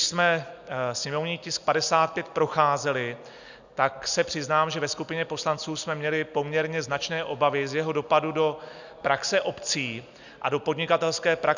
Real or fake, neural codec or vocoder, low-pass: real; none; 7.2 kHz